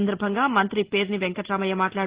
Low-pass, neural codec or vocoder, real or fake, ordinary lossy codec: 3.6 kHz; none; real; Opus, 16 kbps